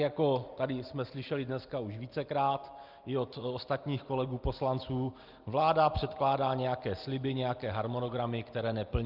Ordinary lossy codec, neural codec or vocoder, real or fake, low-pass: Opus, 24 kbps; none; real; 5.4 kHz